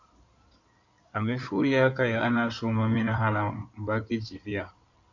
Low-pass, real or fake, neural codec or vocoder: 7.2 kHz; fake; codec, 16 kHz in and 24 kHz out, 2.2 kbps, FireRedTTS-2 codec